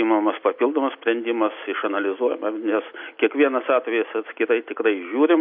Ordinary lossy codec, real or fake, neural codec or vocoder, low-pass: MP3, 32 kbps; real; none; 5.4 kHz